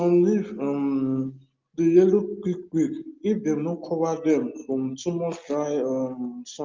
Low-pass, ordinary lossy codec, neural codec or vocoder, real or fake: 7.2 kHz; Opus, 24 kbps; none; real